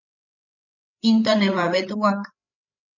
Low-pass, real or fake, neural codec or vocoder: 7.2 kHz; fake; codec, 16 kHz, 8 kbps, FreqCodec, larger model